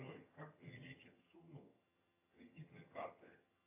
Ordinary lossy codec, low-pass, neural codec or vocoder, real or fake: AAC, 24 kbps; 3.6 kHz; vocoder, 22.05 kHz, 80 mel bands, HiFi-GAN; fake